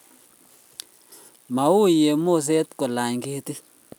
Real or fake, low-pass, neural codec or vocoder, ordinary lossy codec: real; none; none; none